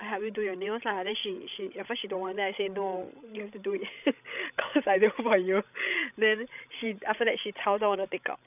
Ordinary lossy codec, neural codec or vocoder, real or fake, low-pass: none; codec, 16 kHz, 16 kbps, FreqCodec, larger model; fake; 3.6 kHz